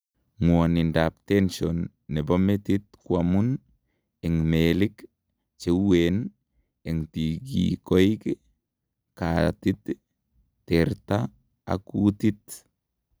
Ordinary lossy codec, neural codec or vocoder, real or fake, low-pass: none; none; real; none